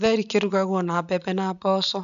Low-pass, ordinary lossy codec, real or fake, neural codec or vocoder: 7.2 kHz; none; real; none